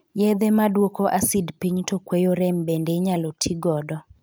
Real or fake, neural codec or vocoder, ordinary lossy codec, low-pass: real; none; none; none